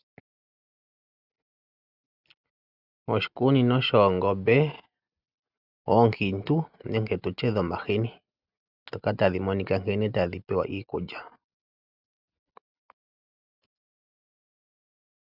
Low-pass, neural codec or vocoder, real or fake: 5.4 kHz; none; real